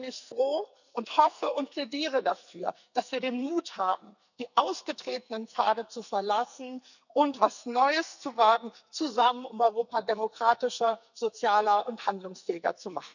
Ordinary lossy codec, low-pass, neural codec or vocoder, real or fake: none; 7.2 kHz; codec, 44.1 kHz, 2.6 kbps, SNAC; fake